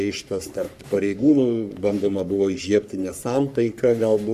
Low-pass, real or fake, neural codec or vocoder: 14.4 kHz; fake; codec, 44.1 kHz, 3.4 kbps, Pupu-Codec